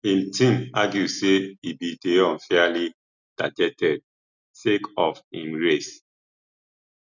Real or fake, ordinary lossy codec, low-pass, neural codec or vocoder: real; none; 7.2 kHz; none